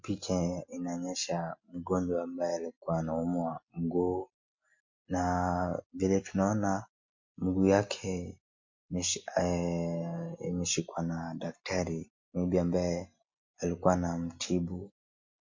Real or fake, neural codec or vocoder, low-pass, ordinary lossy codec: real; none; 7.2 kHz; MP3, 48 kbps